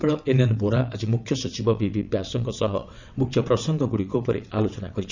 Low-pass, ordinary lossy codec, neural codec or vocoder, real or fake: 7.2 kHz; none; vocoder, 22.05 kHz, 80 mel bands, WaveNeXt; fake